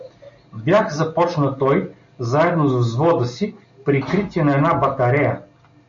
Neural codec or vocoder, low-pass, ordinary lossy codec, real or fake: none; 7.2 kHz; MP3, 48 kbps; real